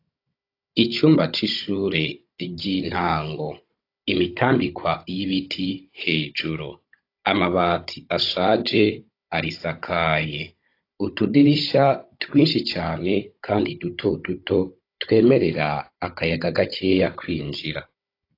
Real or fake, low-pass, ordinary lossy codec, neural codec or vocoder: fake; 5.4 kHz; AAC, 32 kbps; codec, 16 kHz, 16 kbps, FunCodec, trained on Chinese and English, 50 frames a second